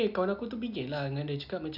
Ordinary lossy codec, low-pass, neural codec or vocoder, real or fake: none; 5.4 kHz; none; real